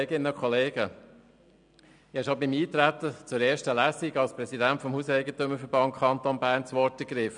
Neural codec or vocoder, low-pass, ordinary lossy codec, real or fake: none; 9.9 kHz; none; real